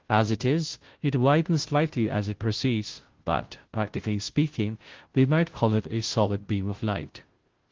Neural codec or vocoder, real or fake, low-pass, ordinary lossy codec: codec, 16 kHz, 0.5 kbps, FunCodec, trained on Chinese and English, 25 frames a second; fake; 7.2 kHz; Opus, 16 kbps